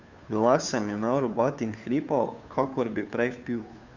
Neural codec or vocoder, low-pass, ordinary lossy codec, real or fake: codec, 16 kHz, 2 kbps, FunCodec, trained on Chinese and English, 25 frames a second; 7.2 kHz; none; fake